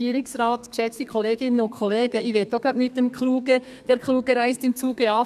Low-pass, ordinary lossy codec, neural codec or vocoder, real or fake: 14.4 kHz; none; codec, 32 kHz, 1.9 kbps, SNAC; fake